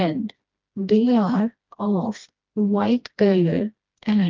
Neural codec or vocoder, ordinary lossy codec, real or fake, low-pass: codec, 16 kHz, 1 kbps, FreqCodec, smaller model; Opus, 32 kbps; fake; 7.2 kHz